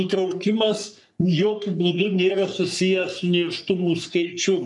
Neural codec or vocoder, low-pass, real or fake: codec, 44.1 kHz, 3.4 kbps, Pupu-Codec; 10.8 kHz; fake